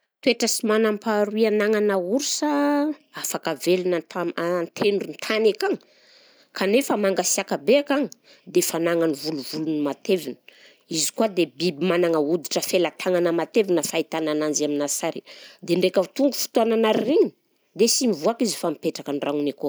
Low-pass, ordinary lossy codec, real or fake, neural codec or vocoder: none; none; real; none